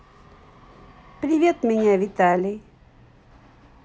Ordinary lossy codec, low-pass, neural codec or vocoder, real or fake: none; none; none; real